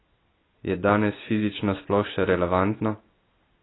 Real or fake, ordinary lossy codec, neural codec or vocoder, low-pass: real; AAC, 16 kbps; none; 7.2 kHz